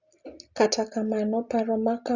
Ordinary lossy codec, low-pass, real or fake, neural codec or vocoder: Opus, 64 kbps; 7.2 kHz; real; none